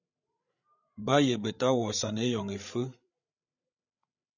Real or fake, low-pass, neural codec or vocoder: fake; 7.2 kHz; codec, 16 kHz, 8 kbps, FreqCodec, larger model